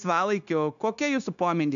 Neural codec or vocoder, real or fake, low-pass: codec, 16 kHz, 0.9 kbps, LongCat-Audio-Codec; fake; 7.2 kHz